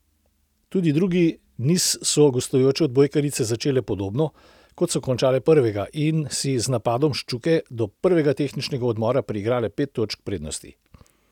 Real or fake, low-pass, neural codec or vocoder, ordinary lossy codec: real; 19.8 kHz; none; none